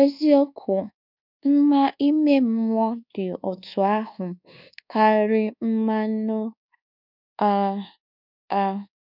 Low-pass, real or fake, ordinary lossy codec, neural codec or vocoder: 5.4 kHz; fake; none; codec, 24 kHz, 1.2 kbps, DualCodec